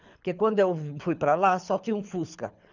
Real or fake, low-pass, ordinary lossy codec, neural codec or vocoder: fake; 7.2 kHz; none; codec, 24 kHz, 6 kbps, HILCodec